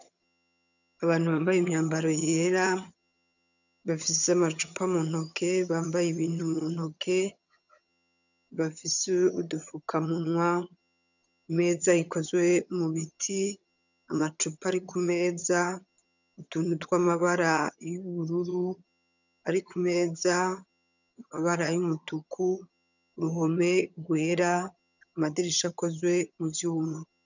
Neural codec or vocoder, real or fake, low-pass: vocoder, 22.05 kHz, 80 mel bands, HiFi-GAN; fake; 7.2 kHz